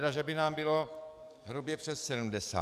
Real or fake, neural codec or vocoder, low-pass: fake; codec, 44.1 kHz, 7.8 kbps, DAC; 14.4 kHz